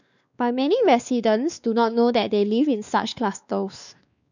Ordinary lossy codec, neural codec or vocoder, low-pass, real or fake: none; codec, 16 kHz, 2 kbps, X-Codec, WavLM features, trained on Multilingual LibriSpeech; 7.2 kHz; fake